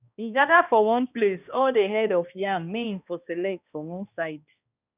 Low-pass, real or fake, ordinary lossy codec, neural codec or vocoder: 3.6 kHz; fake; none; codec, 16 kHz, 1 kbps, X-Codec, HuBERT features, trained on balanced general audio